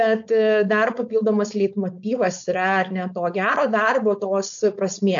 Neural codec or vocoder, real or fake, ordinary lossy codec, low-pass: codec, 16 kHz, 4.8 kbps, FACodec; fake; AAC, 64 kbps; 7.2 kHz